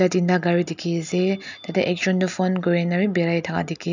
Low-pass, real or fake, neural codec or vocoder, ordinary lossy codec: 7.2 kHz; real; none; none